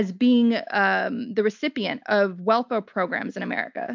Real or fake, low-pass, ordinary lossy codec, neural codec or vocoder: real; 7.2 kHz; MP3, 64 kbps; none